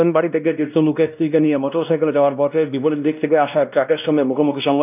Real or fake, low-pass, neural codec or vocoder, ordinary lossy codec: fake; 3.6 kHz; codec, 16 kHz, 1 kbps, X-Codec, WavLM features, trained on Multilingual LibriSpeech; none